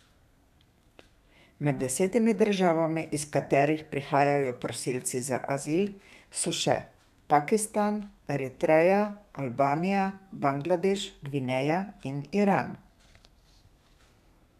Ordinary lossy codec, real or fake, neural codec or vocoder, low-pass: none; fake; codec, 32 kHz, 1.9 kbps, SNAC; 14.4 kHz